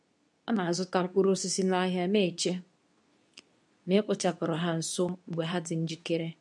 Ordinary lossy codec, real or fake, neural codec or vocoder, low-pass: none; fake; codec, 24 kHz, 0.9 kbps, WavTokenizer, medium speech release version 2; none